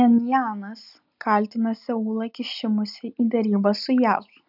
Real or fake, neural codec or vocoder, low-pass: real; none; 5.4 kHz